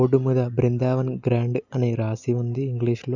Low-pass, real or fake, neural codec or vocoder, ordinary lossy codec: 7.2 kHz; real; none; none